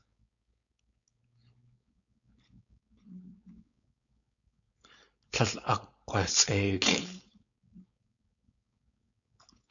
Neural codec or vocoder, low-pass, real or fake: codec, 16 kHz, 4.8 kbps, FACodec; 7.2 kHz; fake